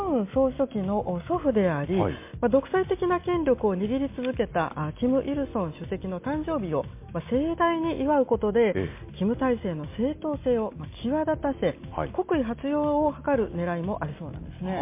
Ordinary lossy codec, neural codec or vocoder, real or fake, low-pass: MP3, 24 kbps; none; real; 3.6 kHz